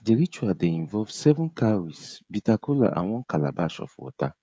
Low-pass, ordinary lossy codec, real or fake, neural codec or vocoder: none; none; fake; codec, 16 kHz, 16 kbps, FreqCodec, smaller model